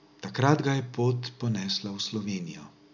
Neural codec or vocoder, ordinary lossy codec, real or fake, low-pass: none; none; real; 7.2 kHz